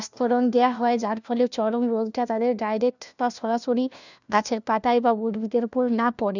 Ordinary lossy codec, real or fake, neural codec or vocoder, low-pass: none; fake; codec, 16 kHz, 1 kbps, FunCodec, trained on LibriTTS, 50 frames a second; 7.2 kHz